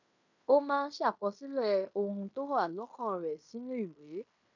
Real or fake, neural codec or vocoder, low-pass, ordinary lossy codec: fake; codec, 16 kHz in and 24 kHz out, 0.9 kbps, LongCat-Audio-Codec, fine tuned four codebook decoder; 7.2 kHz; none